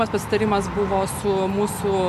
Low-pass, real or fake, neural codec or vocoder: 14.4 kHz; real; none